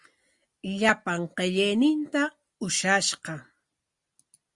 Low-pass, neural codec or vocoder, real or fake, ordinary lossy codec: 10.8 kHz; none; real; Opus, 64 kbps